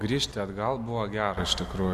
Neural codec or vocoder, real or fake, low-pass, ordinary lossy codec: none; real; 14.4 kHz; MP3, 64 kbps